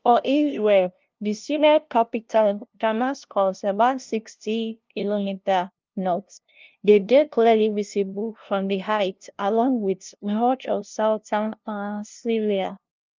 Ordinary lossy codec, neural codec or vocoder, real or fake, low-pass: Opus, 24 kbps; codec, 16 kHz, 0.5 kbps, FunCodec, trained on LibriTTS, 25 frames a second; fake; 7.2 kHz